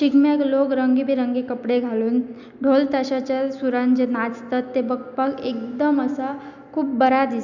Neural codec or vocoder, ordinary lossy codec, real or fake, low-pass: none; none; real; 7.2 kHz